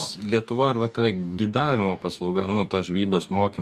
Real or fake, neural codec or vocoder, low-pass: fake; codec, 44.1 kHz, 2.6 kbps, DAC; 14.4 kHz